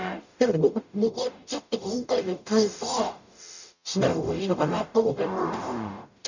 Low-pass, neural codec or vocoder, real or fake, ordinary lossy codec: 7.2 kHz; codec, 44.1 kHz, 0.9 kbps, DAC; fake; none